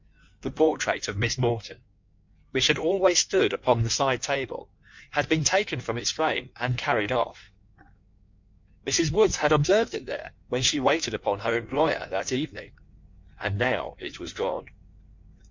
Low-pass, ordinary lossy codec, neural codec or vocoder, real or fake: 7.2 kHz; MP3, 48 kbps; codec, 16 kHz in and 24 kHz out, 1.1 kbps, FireRedTTS-2 codec; fake